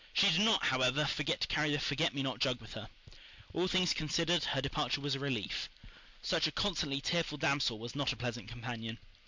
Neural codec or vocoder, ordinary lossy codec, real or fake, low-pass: none; MP3, 64 kbps; real; 7.2 kHz